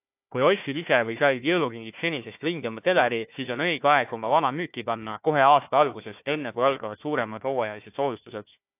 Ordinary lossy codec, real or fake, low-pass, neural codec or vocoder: AAC, 32 kbps; fake; 3.6 kHz; codec, 16 kHz, 1 kbps, FunCodec, trained on Chinese and English, 50 frames a second